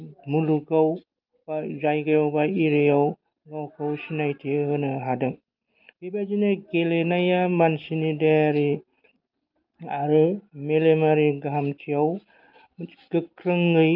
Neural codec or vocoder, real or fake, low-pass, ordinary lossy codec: none; real; 5.4 kHz; Opus, 32 kbps